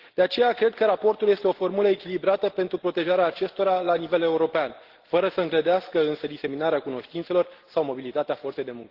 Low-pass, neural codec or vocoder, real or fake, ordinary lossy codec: 5.4 kHz; none; real; Opus, 16 kbps